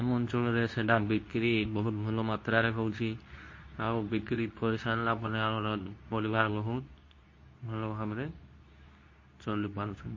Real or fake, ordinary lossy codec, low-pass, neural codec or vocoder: fake; MP3, 32 kbps; 7.2 kHz; codec, 24 kHz, 0.9 kbps, WavTokenizer, medium speech release version 2